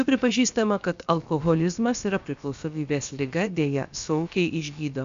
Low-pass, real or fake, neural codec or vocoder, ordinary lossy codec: 7.2 kHz; fake; codec, 16 kHz, about 1 kbps, DyCAST, with the encoder's durations; MP3, 64 kbps